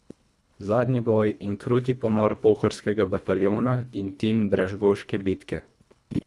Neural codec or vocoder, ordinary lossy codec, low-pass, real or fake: codec, 24 kHz, 1.5 kbps, HILCodec; none; none; fake